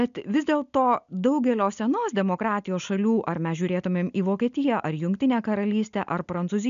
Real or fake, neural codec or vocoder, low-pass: real; none; 7.2 kHz